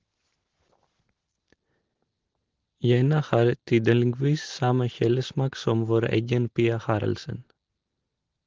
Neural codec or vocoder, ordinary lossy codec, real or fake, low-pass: none; Opus, 16 kbps; real; 7.2 kHz